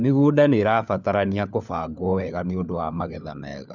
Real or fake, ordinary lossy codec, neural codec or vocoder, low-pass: fake; none; codec, 16 kHz, 4 kbps, FunCodec, trained on LibriTTS, 50 frames a second; 7.2 kHz